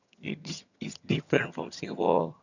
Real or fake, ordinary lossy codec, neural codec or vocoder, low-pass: fake; none; vocoder, 22.05 kHz, 80 mel bands, HiFi-GAN; 7.2 kHz